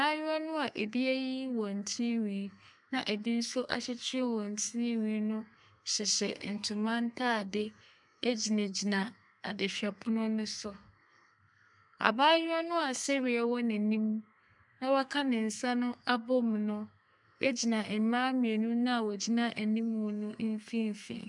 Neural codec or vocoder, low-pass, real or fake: codec, 32 kHz, 1.9 kbps, SNAC; 10.8 kHz; fake